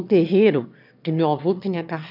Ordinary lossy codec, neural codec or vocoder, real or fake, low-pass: none; autoencoder, 22.05 kHz, a latent of 192 numbers a frame, VITS, trained on one speaker; fake; 5.4 kHz